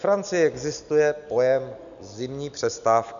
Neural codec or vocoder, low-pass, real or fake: none; 7.2 kHz; real